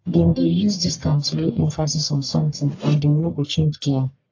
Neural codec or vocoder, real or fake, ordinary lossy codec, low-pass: codec, 44.1 kHz, 1.7 kbps, Pupu-Codec; fake; AAC, 48 kbps; 7.2 kHz